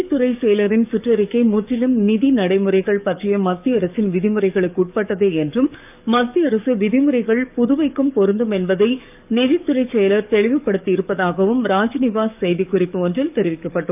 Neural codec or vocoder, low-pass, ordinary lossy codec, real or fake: codec, 44.1 kHz, 7.8 kbps, DAC; 3.6 kHz; none; fake